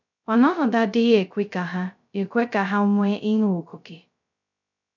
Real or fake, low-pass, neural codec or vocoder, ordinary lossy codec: fake; 7.2 kHz; codec, 16 kHz, 0.2 kbps, FocalCodec; none